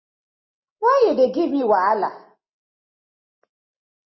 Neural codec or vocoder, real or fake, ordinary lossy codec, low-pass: none; real; MP3, 24 kbps; 7.2 kHz